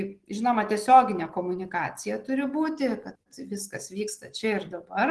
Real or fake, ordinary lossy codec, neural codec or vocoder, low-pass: real; Opus, 16 kbps; none; 10.8 kHz